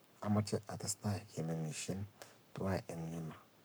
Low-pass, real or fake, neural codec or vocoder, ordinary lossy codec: none; fake; codec, 44.1 kHz, 7.8 kbps, Pupu-Codec; none